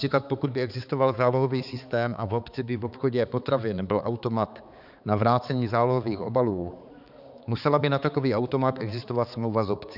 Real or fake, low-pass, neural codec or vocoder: fake; 5.4 kHz; codec, 16 kHz, 4 kbps, X-Codec, HuBERT features, trained on balanced general audio